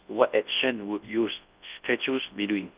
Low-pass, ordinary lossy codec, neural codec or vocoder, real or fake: 3.6 kHz; Opus, 32 kbps; codec, 24 kHz, 0.9 kbps, WavTokenizer, large speech release; fake